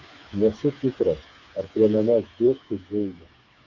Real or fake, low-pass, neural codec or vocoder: fake; 7.2 kHz; codec, 16 kHz, 4 kbps, FreqCodec, smaller model